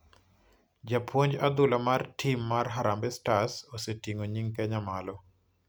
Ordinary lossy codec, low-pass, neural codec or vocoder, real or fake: none; none; none; real